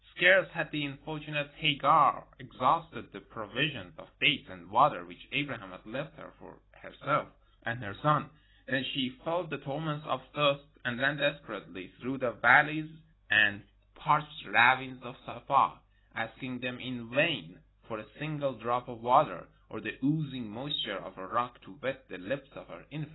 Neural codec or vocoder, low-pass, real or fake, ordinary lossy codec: none; 7.2 kHz; real; AAC, 16 kbps